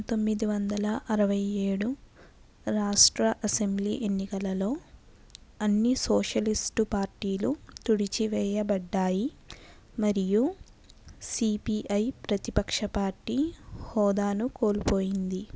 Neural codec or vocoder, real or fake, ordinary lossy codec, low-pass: none; real; none; none